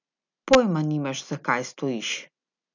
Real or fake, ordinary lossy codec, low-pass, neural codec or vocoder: real; none; 7.2 kHz; none